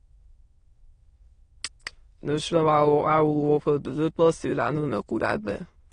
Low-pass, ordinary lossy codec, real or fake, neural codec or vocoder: 9.9 kHz; AAC, 32 kbps; fake; autoencoder, 22.05 kHz, a latent of 192 numbers a frame, VITS, trained on many speakers